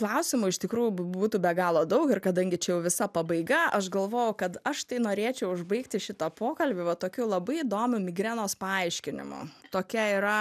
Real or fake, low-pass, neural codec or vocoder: real; 14.4 kHz; none